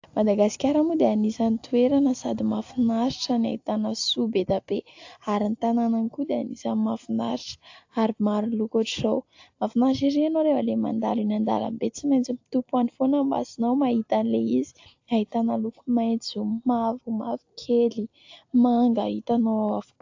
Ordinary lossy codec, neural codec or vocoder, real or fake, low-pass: AAC, 48 kbps; none; real; 7.2 kHz